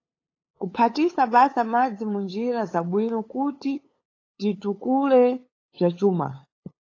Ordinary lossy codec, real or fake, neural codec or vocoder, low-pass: AAC, 32 kbps; fake; codec, 16 kHz, 8 kbps, FunCodec, trained on LibriTTS, 25 frames a second; 7.2 kHz